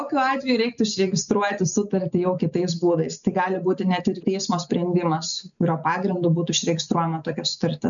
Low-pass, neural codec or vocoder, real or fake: 7.2 kHz; none; real